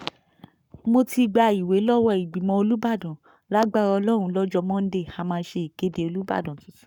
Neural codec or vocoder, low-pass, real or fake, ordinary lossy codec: codec, 44.1 kHz, 7.8 kbps, Pupu-Codec; 19.8 kHz; fake; none